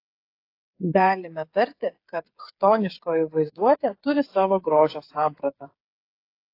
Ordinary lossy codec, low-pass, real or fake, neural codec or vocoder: AAC, 32 kbps; 5.4 kHz; fake; codec, 16 kHz, 8 kbps, FreqCodec, smaller model